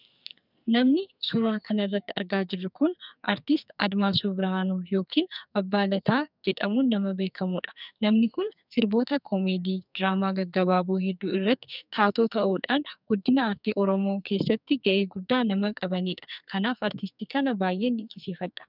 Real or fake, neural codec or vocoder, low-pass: fake; codec, 44.1 kHz, 2.6 kbps, SNAC; 5.4 kHz